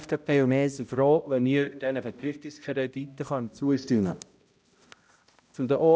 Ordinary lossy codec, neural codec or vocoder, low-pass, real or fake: none; codec, 16 kHz, 0.5 kbps, X-Codec, HuBERT features, trained on balanced general audio; none; fake